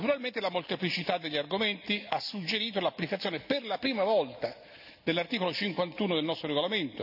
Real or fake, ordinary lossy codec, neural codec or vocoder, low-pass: real; none; none; 5.4 kHz